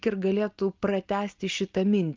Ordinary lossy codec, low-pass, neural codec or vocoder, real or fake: Opus, 32 kbps; 7.2 kHz; none; real